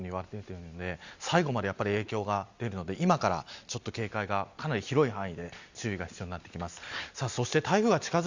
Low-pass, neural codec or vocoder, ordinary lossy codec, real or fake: 7.2 kHz; none; Opus, 64 kbps; real